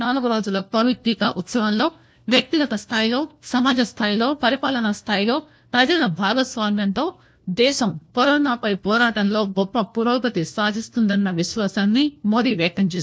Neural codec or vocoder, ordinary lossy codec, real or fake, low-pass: codec, 16 kHz, 1 kbps, FunCodec, trained on LibriTTS, 50 frames a second; none; fake; none